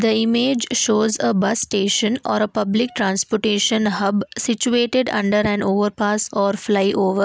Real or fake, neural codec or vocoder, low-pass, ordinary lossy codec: real; none; none; none